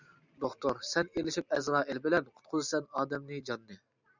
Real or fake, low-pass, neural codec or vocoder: real; 7.2 kHz; none